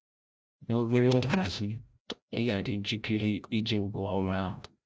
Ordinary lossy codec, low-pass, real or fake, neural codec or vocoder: none; none; fake; codec, 16 kHz, 0.5 kbps, FreqCodec, larger model